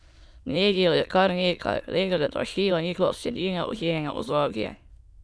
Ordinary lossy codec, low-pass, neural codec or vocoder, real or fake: none; none; autoencoder, 22.05 kHz, a latent of 192 numbers a frame, VITS, trained on many speakers; fake